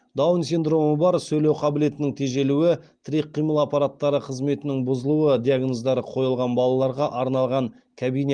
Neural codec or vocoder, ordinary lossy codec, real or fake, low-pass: none; Opus, 24 kbps; real; 9.9 kHz